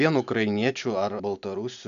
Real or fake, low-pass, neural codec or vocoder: real; 7.2 kHz; none